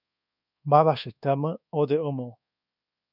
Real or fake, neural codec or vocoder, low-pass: fake; codec, 24 kHz, 1.2 kbps, DualCodec; 5.4 kHz